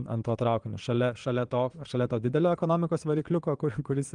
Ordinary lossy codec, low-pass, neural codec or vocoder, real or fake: Opus, 24 kbps; 9.9 kHz; vocoder, 22.05 kHz, 80 mel bands, Vocos; fake